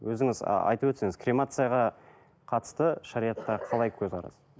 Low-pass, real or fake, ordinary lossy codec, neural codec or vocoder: none; real; none; none